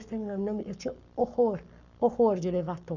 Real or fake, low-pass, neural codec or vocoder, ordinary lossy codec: fake; 7.2 kHz; codec, 44.1 kHz, 7.8 kbps, Pupu-Codec; none